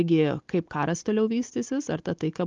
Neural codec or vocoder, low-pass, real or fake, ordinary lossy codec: none; 7.2 kHz; real; Opus, 32 kbps